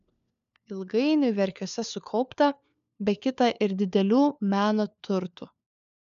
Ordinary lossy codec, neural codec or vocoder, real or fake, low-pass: MP3, 96 kbps; codec, 16 kHz, 4 kbps, FunCodec, trained on LibriTTS, 50 frames a second; fake; 7.2 kHz